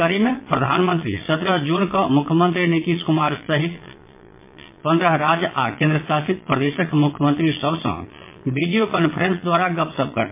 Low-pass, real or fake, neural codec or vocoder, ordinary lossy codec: 3.6 kHz; fake; vocoder, 22.05 kHz, 80 mel bands, Vocos; MP3, 24 kbps